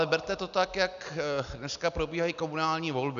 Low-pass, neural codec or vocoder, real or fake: 7.2 kHz; none; real